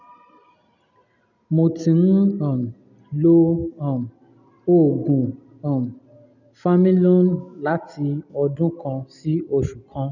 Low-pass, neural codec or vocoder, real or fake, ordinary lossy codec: 7.2 kHz; none; real; none